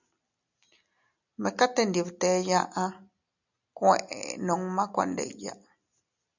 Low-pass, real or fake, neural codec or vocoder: 7.2 kHz; real; none